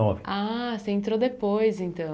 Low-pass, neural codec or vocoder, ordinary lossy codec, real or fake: none; none; none; real